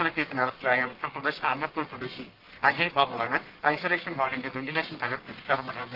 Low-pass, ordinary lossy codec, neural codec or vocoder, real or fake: 5.4 kHz; Opus, 32 kbps; codec, 44.1 kHz, 1.7 kbps, Pupu-Codec; fake